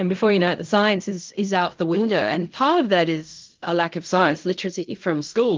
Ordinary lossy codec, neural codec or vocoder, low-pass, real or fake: Opus, 24 kbps; codec, 16 kHz in and 24 kHz out, 0.4 kbps, LongCat-Audio-Codec, fine tuned four codebook decoder; 7.2 kHz; fake